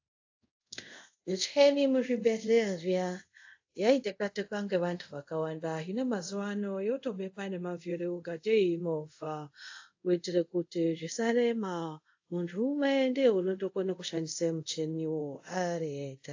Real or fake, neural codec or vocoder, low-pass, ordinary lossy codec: fake; codec, 24 kHz, 0.5 kbps, DualCodec; 7.2 kHz; AAC, 48 kbps